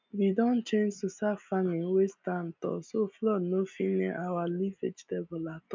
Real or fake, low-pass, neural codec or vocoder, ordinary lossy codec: real; 7.2 kHz; none; MP3, 64 kbps